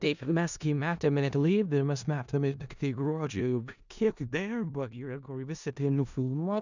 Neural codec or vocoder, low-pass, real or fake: codec, 16 kHz in and 24 kHz out, 0.4 kbps, LongCat-Audio-Codec, four codebook decoder; 7.2 kHz; fake